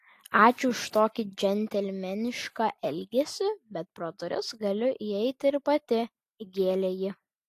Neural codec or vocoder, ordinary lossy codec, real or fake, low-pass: none; AAC, 64 kbps; real; 14.4 kHz